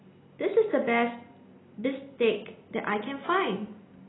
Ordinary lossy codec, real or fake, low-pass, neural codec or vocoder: AAC, 16 kbps; real; 7.2 kHz; none